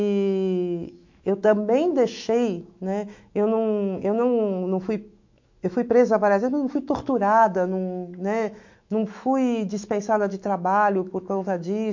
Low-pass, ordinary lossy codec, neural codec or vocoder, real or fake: 7.2 kHz; MP3, 48 kbps; autoencoder, 48 kHz, 128 numbers a frame, DAC-VAE, trained on Japanese speech; fake